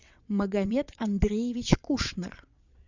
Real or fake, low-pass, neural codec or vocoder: fake; 7.2 kHz; vocoder, 22.05 kHz, 80 mel bands, WaveNeXt